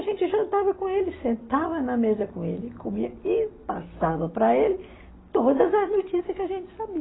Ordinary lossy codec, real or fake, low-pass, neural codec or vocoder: AAC, 16 kbps; real; 7.2 kHz; none